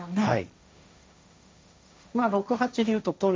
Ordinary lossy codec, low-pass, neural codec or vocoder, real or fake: none; none; codec, 16 kHz, 1.1 kbps, Voila-Tokenizer; fake